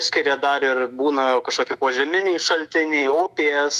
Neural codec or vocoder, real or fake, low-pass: autoencoder, 48 kHz, 128 numbers a frame, DAC-VAE, trained on Japanese speech; fake; 14.4 kHz